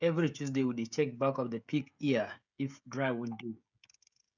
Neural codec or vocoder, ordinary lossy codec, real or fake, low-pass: codec, 16 kHz, 16 kbps, FreqCodec, smaller model; none; fake; 7.2 kHz